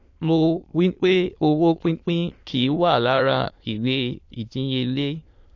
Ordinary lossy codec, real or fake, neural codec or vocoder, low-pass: AAC, 48 kbps; fake; autoencoder, 22.05 kHz, a latent of 192 numbers a frame, VITS, trained on many speakers; 7.2 kHz